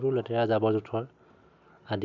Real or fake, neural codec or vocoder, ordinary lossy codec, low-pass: real; none; none; 7.2 kHz